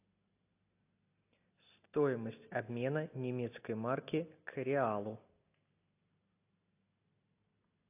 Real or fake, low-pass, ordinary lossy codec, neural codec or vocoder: real; 3.6 kHz; AAC, 24 kbps; none